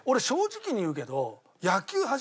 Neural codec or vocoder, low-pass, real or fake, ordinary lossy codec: none; none; real; none